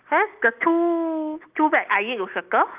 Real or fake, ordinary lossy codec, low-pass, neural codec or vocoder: real; Opus, 32 kbps; 3.6 kHz; none